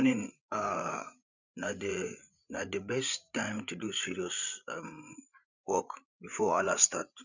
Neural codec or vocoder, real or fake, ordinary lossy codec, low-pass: codec, 16 kHz, 8 kbps, FreqCodec, larger model; fake; none; 7.2 kHz